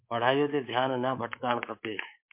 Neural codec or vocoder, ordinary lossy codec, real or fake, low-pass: codec, 24 kHz, 3.1 kbps, DualCodec; MP3, 32 kbps; fake; 3.6 kHz